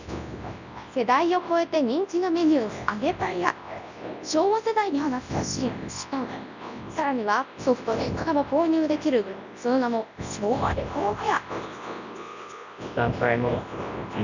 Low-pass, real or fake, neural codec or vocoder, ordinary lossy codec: 7.2 kHz; fake; codec, 24 kHz, 0.9 kbps, WavTokenizer, large speech release; none